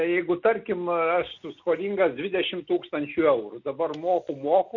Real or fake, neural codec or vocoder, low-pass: real; none; 7.2 kHz